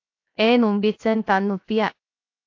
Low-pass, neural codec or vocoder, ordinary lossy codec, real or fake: 7.2 kHz; codec, 16 kHz, 0.7 kbps, FocalCodec; AAC, 48 kbps; fake